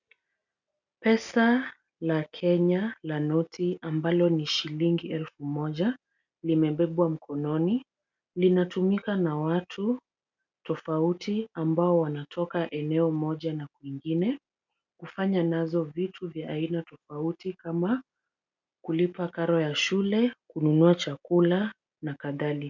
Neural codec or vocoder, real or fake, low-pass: none; real; 7.2 kHz